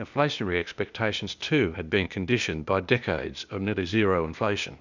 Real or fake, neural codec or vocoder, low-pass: fake; codec, 16 kHz, 0.8 kbps, ZipCodec; 7.2 kHz